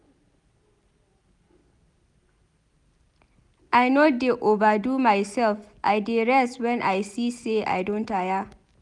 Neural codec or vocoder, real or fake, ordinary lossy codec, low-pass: none; real; none; 10.8 kHz